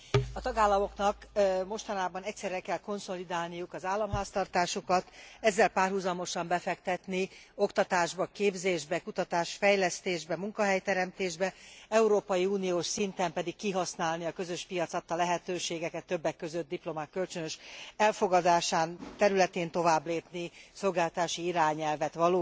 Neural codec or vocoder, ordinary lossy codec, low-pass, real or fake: none; none; none; real